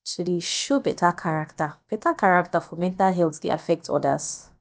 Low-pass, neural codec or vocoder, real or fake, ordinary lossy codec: none; codec, 16 kHz, about 1 kbps, DyCAST, with the encoder's durations; fake; none